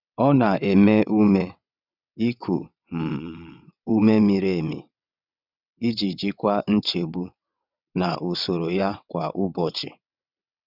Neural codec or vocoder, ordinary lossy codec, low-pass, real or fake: vocoder, 22.05 kHz, 80 mel bands, WaveNeXt; none; 5.4 kHz; fake